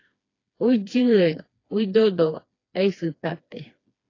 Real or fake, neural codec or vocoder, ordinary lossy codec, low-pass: fake; codec, 16 kHz, 2 kbps, FreqCodec, smaller model; AAC, 32 kbps; 7.2 kHz